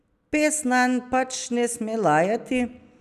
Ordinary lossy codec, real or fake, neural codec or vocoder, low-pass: none; real; none; 14.4 kHz